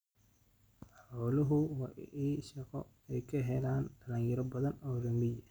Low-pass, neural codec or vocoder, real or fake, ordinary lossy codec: none; none; real; none